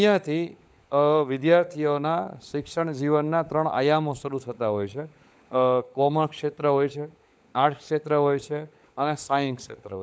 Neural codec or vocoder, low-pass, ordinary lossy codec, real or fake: codec, 16 kHz, 16 kbps, FunCodec, trained on LibriTTS, 50 frames a second; none; none; fake